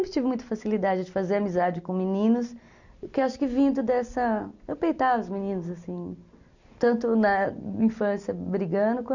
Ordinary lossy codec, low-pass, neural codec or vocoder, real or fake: none; 7.2 kHz; none; real